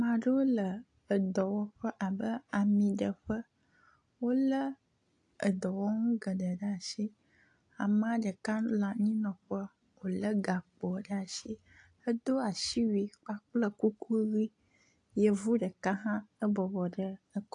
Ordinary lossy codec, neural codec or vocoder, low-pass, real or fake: AAC, 48 kbps; none; 9.9 kHz; real